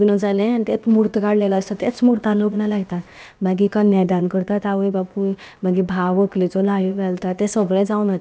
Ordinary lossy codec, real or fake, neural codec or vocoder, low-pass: none; fake; codec, 16 kHz, about 1 kbps, DyCAST, with the encoder's durations; none